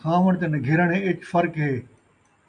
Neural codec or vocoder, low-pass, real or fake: none; 9.9 kHz; real